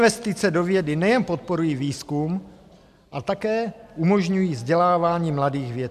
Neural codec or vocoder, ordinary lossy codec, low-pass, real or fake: none; MP3, 96 kbps; 14.4 kHz; real